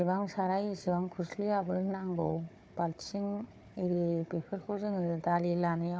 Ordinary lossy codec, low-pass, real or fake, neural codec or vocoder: none; none; fake; codec, 16 kHz, 4 kbps, FunCodec, trained on Chinese and English, 50 frames a second